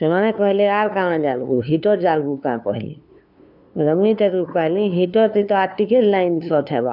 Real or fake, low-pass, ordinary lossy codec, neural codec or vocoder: fake; 5.4 kHz; none; codec, 16 kHz, 2 kbps, FunCodec, trained on LibriTTS, 25 frames a second